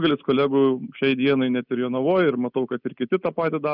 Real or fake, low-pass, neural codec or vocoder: real; 5.4 kHz; none